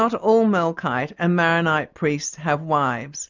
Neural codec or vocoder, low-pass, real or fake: none; 7.2 kHz; real